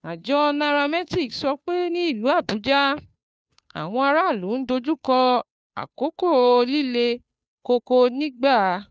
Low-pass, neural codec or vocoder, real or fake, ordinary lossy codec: none; codec, 16 kHz, 2 kbps, FunCodec, trained on Chinese and English, 25 frames a second; fake; none